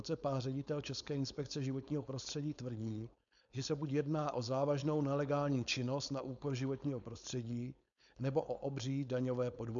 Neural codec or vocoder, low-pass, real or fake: codec, 16 kHz, 4.8 kbps, FACodec; 7.2 kHz; fake